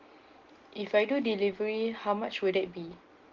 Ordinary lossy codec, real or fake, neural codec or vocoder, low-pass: Opus, 16 kbps; real; none; 7.2 kHz